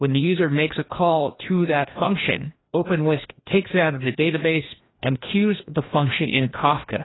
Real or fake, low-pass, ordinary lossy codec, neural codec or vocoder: fake; 7.2 kHz; AAC, 16 kbps; codec, 16 kHz, 1 kbps, FreqCodec, larger model